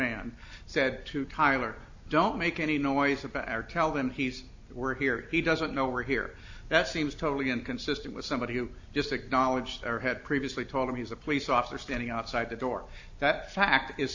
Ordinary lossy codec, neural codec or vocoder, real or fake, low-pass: AAC, 48 kbps; none; real; 7.2 kHz